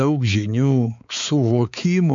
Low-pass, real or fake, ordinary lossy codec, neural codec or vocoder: 7.2 kHz; fake; MP3, 48 kbps; codec, 16 kHz, 4 kbps, X-Codec, HuBERT features, trained on balanced general audio